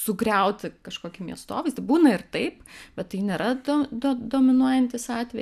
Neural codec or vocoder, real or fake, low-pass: none; real; 14.4 kHz